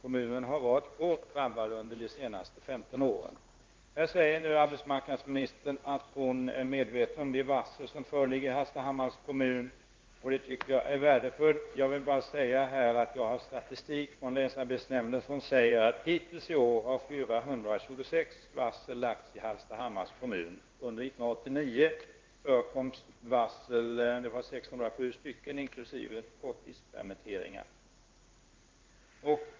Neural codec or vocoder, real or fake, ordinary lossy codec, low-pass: codec, 16 kHz in and 24 kHz out, 1 kbps, XY-Tokenizer; fake; Opus, 32 kbps; 7.2 kHz